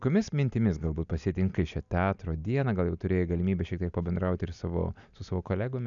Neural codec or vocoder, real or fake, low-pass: none; real; 7.2 kHz